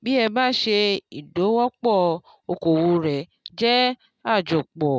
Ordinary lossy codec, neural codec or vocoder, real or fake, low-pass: none; none; real; none